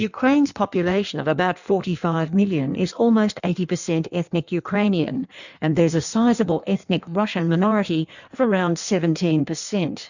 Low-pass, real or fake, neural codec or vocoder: 7.2 kHz; fake; codec, 16 kHz in and 24 kHz out, 1.1 kbps, FireRedTTS-2 codec